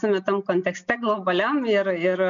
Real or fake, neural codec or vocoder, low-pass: real; none; 7.2 kHz